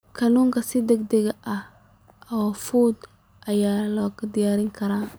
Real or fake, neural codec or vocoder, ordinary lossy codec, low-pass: real; none; none; none